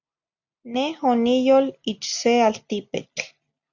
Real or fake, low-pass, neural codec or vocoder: real; 7.2 kHz; none